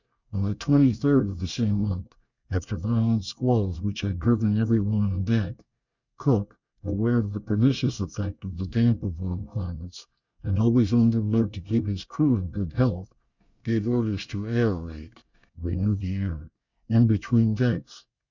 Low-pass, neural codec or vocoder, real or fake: 7.2 kHz; codec, 24 kHz, 1 kbps, SNAC; fake